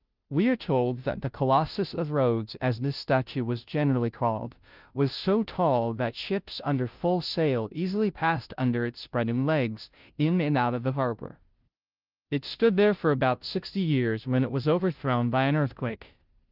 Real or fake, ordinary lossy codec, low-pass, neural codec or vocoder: fake; Opus, 32 kbps; 5.4 kHz; codec, 16 kHz, 0.5 kbps, FunCodec, trained on Chinese and English, 25 frames a second